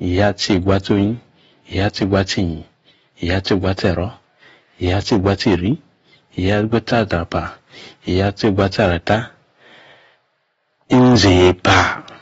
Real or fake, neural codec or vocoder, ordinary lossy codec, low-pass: real; none; AAC, 24 kbps; 19.8 kHz